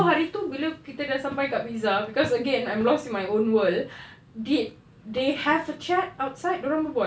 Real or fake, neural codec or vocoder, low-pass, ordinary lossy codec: real; none; none; none